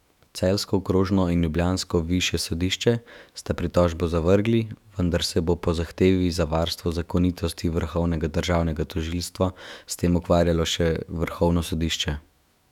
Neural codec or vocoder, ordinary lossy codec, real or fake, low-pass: autoencoder, 48 kHz, 128 numbers a frame, DAC-VAE, trained on Japanese speech; none; fake; 19.8 kHz